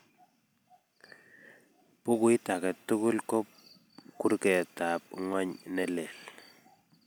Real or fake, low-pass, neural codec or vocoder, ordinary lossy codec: real; none; none; none